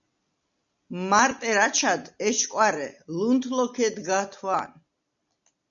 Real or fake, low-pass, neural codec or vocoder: real; 7.2 kHz; none